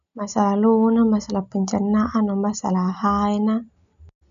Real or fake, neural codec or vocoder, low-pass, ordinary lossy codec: real; none; 7.2 kHz; none